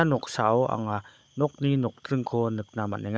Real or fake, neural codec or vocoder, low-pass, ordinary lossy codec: fake; codec, 16 kHz, 16 kbps, FunCodec, trained on Chinese and English, 50 frames a second; none; none